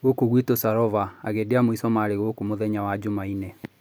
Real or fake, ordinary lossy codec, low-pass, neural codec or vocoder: real; none; none; none